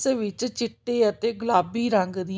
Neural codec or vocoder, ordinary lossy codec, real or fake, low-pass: none; none; real; none